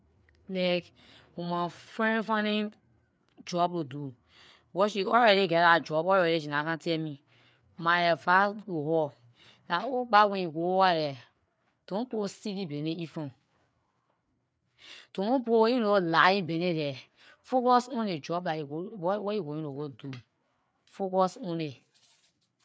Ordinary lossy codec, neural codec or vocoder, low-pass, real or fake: none; codec, 16 kHz, 4 kbps, FreqCodec, larger model; none; fake